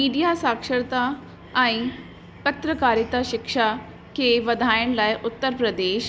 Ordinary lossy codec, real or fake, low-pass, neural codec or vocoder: none; real; none; none